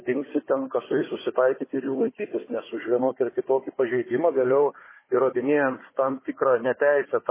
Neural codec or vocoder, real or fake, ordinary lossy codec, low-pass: codec, 16 kHz, 4 kbps, FunCodec, trained on Chinese and English, 50 frames a second; fake; MP3, 16 kbps; 3.6 kHz